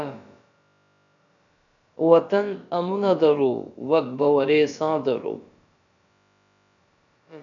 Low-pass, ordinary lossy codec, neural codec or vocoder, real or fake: 7.2 kHz; MP3, 96 kbps; codec, 16 kHz, about 1 kbps, DyCAST, with the encoder's durations; fake